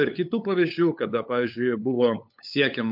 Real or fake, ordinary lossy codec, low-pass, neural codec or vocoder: fake; MP3, 48 kbps; 5.4 kHz; codec, 16 kHz, 8 kbps, FunCodec, trained on LibriTTS, 25 frames a second